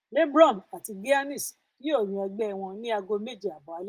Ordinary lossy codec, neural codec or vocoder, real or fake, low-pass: Opus, 24 kbps; none; real; 14.4 kHz